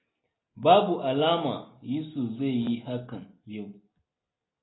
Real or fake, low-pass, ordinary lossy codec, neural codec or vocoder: real; 7.2 kHz; AAC, 16 kbps; none